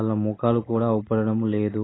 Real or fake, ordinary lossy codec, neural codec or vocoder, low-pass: real; AAC, 16 kbps; none; 7.2 kHz